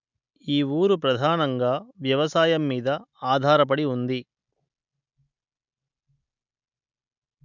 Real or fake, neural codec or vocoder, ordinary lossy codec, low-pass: real; none; none; 7.2 kHz